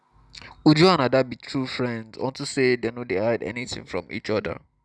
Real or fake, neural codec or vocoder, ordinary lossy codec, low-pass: real; none; none; none